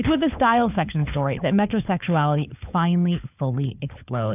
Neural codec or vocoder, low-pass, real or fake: codec, 16 kHz, 4 kbps, FunCodec, trained on LibriTTS, 50 frames a second; 3.6 kHz; fake